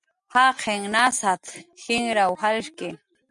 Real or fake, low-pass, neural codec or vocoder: real; 10.8 kHz; none